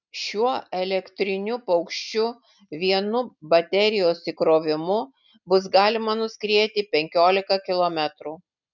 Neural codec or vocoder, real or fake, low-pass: none; real; 7.2 kHz